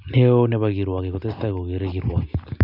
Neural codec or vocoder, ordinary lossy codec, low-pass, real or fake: none; none; 5.4 kHz; real